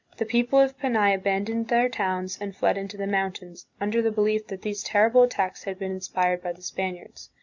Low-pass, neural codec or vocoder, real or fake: 7.2 kHz; none; real